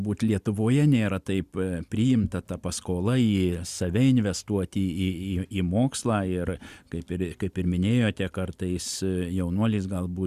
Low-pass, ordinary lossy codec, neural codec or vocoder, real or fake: 14.4 kHz; Opus, 64 kbps; none; real